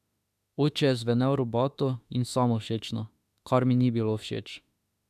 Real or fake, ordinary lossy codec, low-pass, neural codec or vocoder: fake; none; 14.4 kHz; autoencoder, 48 kHz, 32 numbers a frame, DAC-VAE, trained on Japanese speech